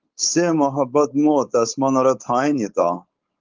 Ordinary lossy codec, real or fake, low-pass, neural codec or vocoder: Opus, 32 kbps; fake; 7.2 kHz; codec, 16 kHz, 4.8 kbps, FACodec